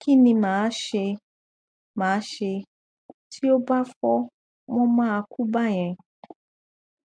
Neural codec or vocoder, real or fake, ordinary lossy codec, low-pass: none; real; MP3, 64 kbps; 9.9 kHz